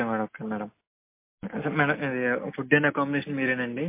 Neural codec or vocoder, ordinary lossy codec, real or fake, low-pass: none; MP3, 24 kbps; real; 3.6 kHz